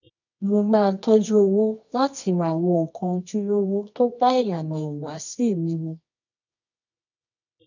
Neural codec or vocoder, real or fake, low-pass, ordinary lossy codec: codec, 24 kHz, 0.9 kbps, WavTokenizer, medium music audio release; fake; 7.2 kHz; none